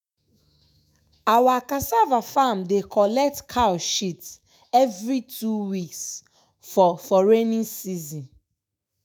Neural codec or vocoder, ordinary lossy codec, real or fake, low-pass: autoencoder, 48 kHz, 128 numbers a frame, DAC-VAE, trained on Japanese speech; none; fake; none